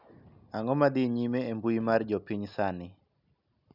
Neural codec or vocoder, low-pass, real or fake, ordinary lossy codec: none; 5.4 kHz; real; none